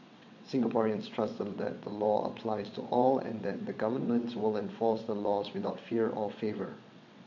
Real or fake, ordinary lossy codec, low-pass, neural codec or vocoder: fake; AAC, 48 kbps; 7.2 kHz; vocoder, 22.05 kHz, 80 mel bands, WaveNeXt